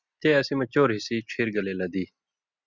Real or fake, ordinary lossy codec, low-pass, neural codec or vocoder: real; Opus, 64 kbps; 7.2 kHz; none